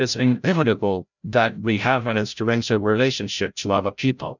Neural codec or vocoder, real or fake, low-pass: codec, 16 kHz, 0.5 kbps, FreqCodec, larger model; fake; 7.2 kHz